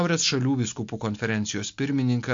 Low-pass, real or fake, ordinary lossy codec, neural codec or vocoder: 7.2 kHz; real; MP3, 48 kbps; none